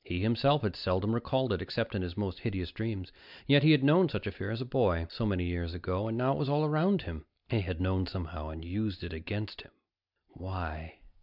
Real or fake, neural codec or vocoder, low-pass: real; none; 5.4 kHz